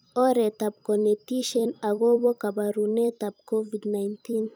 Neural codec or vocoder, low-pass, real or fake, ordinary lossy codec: vocoder, 44.1 kHz, 128 mel bands every 256 samples, BigVGAN v2; none; fake; none